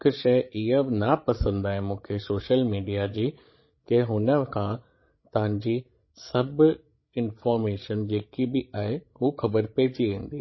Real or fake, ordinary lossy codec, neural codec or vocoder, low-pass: fake; MP3, 24 kbps; codec, 16 kHz, 16 kbps, FreqCodec, larger model; 7.2 kHz